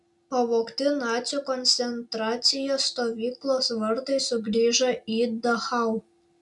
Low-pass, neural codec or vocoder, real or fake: 10.8 kHz; none; real